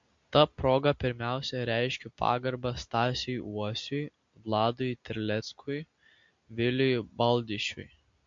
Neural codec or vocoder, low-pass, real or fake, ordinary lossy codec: none; 7.2 kHz; real; MP3, 48 kbps